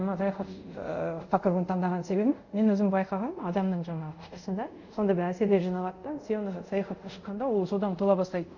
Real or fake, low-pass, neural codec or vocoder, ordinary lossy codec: fake; 7.2 kHz; codec, 24 kHz, 0.5 kbps, DualCodec; none